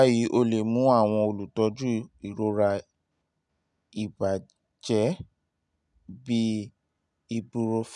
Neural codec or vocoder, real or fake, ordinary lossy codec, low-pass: none; real; none; 10.8 kHz